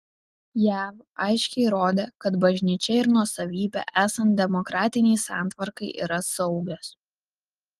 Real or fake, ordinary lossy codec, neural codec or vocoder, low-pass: real; Opus, 24 kbps; none; 14.4 kHz